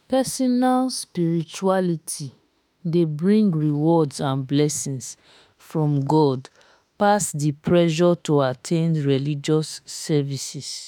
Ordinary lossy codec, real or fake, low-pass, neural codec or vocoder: none; fake; none; autoencoder, 48 kHz, 32 numbers a frame, DAC-VAE, trained on Japanese speech